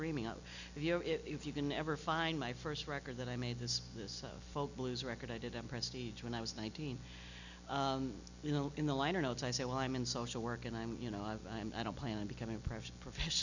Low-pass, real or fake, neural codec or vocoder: 7.2 kHz; real; none